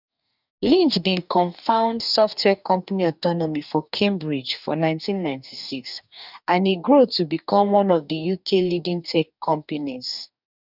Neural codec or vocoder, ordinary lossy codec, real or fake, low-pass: codec, 44.1 kHz, 2.6 kbps, DAC; none; fake; 5.4 kHz